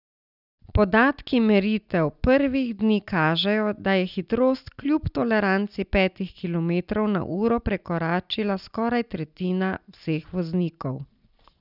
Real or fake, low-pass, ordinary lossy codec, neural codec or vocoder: real; 5.4 kHz; none; none